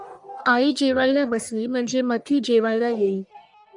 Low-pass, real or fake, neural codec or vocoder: 10.8 kHz; fake; codec, 44.1 kHz, 1.7 kbps, Pupu-Codec